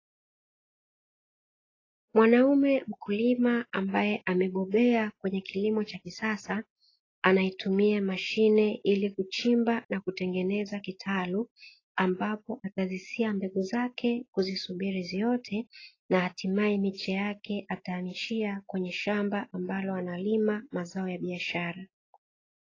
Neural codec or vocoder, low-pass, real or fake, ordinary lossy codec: none; 7.2 kHz; real; AAC, 32 kbps